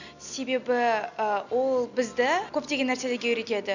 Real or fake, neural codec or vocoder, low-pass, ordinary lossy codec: real; none; 7.2 kHz; none